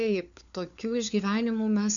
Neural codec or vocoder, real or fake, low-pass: codec, 16 kHz, 4 kbps, FunCodec, trained on LibriTTS, 50 frames a second; fake; 7.2 kHz